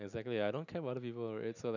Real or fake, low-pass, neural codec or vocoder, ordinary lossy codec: real; 7.2 kHz; none; none